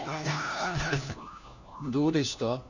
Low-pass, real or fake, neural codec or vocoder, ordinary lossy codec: 7.2 kHz; fake; codec, 16 kHz, 1 kbps, FunCodec, trained on LibriTTS, 50 frames a second; none